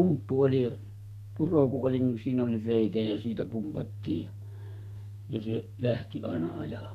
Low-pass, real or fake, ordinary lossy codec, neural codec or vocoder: 14.4 kHz; fake; none; codec, 32 kHz, 1.9 kbps, SNAC